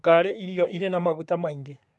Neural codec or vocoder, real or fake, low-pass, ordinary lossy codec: codec, 24 kHz, 1 kbps, SNAC; fake; none; none